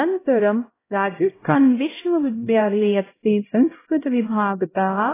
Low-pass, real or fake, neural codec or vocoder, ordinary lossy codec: 3.6 kHz; fake; codec, 16 kHz, 0.5 kbps, X-Codec, HuBERT features, trained on LibriSpeech; AAC, 16 kbps